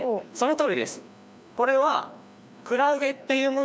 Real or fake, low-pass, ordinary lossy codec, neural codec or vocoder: fake; none; none; codec, 16 kHz, 1 kbps, FreqCodec, larger model